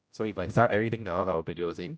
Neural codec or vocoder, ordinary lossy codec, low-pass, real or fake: codec, 16 kHz, 0.5 kbps, X-Codec, HuBERT features, trained on general audio; none; none; fake